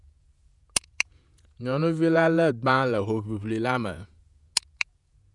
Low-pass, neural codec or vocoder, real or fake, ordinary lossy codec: 10.8 kHz; vocoder, 48 kHz, 128 mel bands, Vocos; fake; none